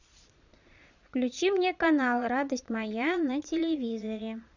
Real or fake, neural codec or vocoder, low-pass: fake; vocoder, 22.05 kHz, 80 mel bands, Vocos; 7.2 kHz